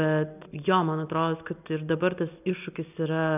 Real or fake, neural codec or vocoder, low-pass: real; none; 3.6 kHz